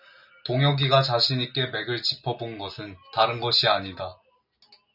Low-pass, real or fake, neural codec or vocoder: 5.4 kHz; real; none